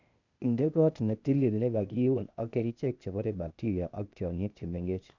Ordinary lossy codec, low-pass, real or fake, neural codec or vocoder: none; 7.2 kHz; fake; codec, 16 kHz, 0.8 kbps, ZipCodec